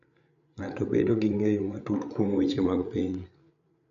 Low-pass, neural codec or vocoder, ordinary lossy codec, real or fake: 7.2 kHz; codec, 16 kHz, 8 kbps, FreqCodec, larger model; none; fake